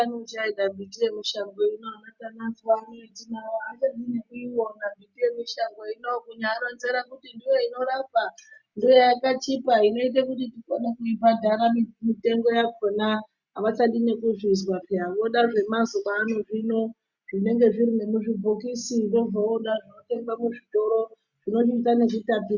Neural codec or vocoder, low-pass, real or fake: none; 7.2 kHz; real